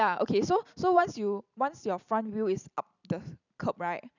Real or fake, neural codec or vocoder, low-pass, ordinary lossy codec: fake; vocoder, 44.1 kHz, 128 mel bands every 256 samples, BigVGAN v2; 7.2 kHz; none